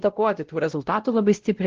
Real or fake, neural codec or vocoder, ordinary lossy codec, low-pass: fake; codec, 16 kHz, 0.5 kbps, X-Codec, WavLM features, trained on Multilingual LibriSpeech; Opus, 16 kbps; 7.2 kHz